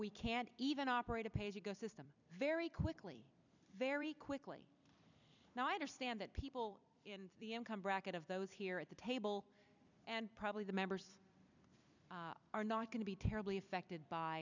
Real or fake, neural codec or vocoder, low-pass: fake; vocoder, 44.1 kHz, 128 mel bands every 256 samples, BigVGAN v2; 7.2 kHz